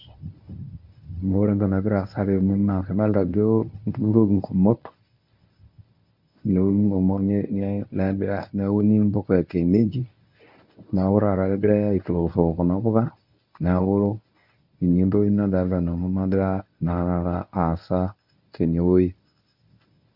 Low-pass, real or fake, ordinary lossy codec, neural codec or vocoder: 5.4 kHz; fake; none; codec, 24 kHz, 0.9 kbps, WavTokenizer, medium speech release version 1